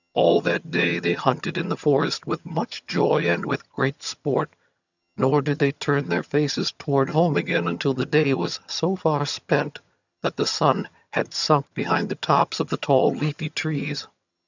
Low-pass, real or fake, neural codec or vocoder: 7.2 kHz; fake; vocoder, 22.05 kHz, 80 mel bands, HiFi-GAN